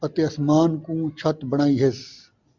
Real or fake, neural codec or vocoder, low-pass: real; none; 7.2 kHz